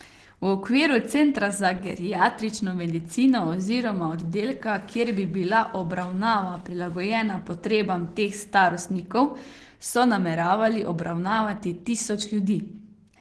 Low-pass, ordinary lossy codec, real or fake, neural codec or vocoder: 10.8 kHz; Opus, 16 kbps; real; none